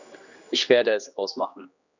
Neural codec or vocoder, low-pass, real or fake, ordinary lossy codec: codec, 16 kHz, 2 kbps, X-Codec, HuBERT features, trained on general audio; 7.2 kHz; fake; none